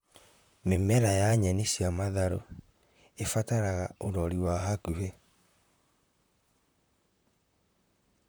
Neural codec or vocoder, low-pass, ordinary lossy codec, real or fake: vocoder, 44.1 kHz, 128 mel bands, Pupu-Vocoder; none; none; fake